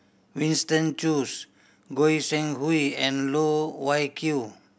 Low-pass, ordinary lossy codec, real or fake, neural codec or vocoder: none; none; real; none